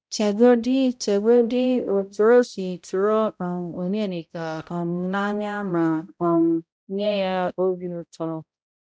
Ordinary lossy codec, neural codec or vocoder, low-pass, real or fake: none; codec, 16 kHz, 0.5 kbps, X-Codec, HuBERT features, trained on balanced general audio; none; fake